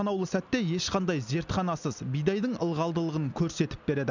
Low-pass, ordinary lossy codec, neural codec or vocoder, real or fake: 7.2 kHz; none; none; real